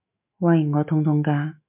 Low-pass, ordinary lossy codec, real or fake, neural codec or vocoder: 3.6 kHz; MP3, 32 kbps; fake; autoencoder, 48 kHz, 128 numbers a frame, DAC-VAE, trained on Japanese speech